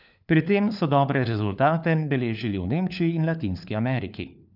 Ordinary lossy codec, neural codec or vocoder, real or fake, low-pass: none; codec, 16 kHz, 4 kbps, FunCodec, trained on LibriTTS, 50 frames a second; fake; 5.4 kHz